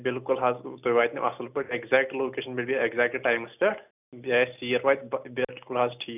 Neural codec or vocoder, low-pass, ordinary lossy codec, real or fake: none; 3.6 kHz; none; real